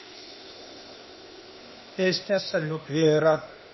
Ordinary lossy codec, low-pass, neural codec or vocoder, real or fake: MP3, 24 kbps; 7.2 kHz; codec, 16 kHz, 0.8 kbps, ZipCodec; fake